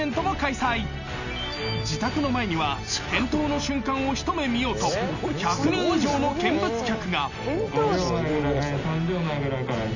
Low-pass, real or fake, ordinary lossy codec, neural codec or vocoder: 7.2 kHz; real; none; none